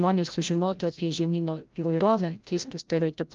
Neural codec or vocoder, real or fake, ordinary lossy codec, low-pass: codec, 16 kHz, 0.5 kbps, FreqCodec, larger model; fake; Opus, 32 kbps; 7.2 kHz